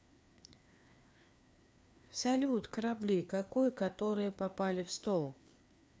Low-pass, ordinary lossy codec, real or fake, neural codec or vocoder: none; none; fake; codec, 16 kHz, 2 kbps, FreqCodec, larger model